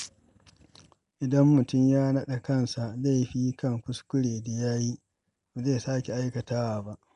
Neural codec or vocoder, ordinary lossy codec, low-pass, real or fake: none; none; 10.8 kHz; real